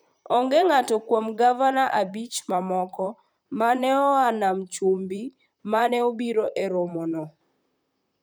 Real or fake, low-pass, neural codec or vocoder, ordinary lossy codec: fake; none; vocoder, 44.1 kHz, 128 mel bands, Pupu-Vocoder; none